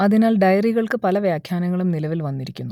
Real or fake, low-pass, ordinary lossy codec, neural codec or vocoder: real; 19.8 kHz; none; none